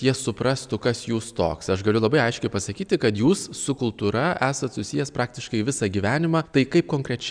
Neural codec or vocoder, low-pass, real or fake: none; 9.9 kHz; real